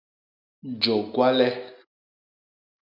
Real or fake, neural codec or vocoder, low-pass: real; none; 5.4 kHz